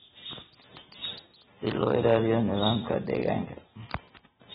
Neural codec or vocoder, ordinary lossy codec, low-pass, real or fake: none; AAC, 16 kbps; 19.8 kHz; real